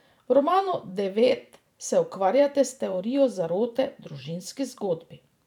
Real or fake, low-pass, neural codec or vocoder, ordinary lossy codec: fake; 19.8 kHz; vocoder, 44.1 kHz, 128 mel bands every 512 samples, BigVGAN v2; none